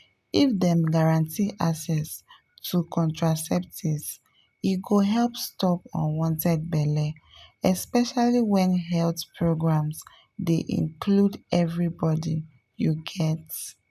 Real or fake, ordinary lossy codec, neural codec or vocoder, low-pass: real; none; none; 14.4 kHz